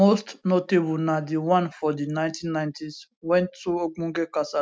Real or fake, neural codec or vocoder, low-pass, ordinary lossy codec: real; none; none; none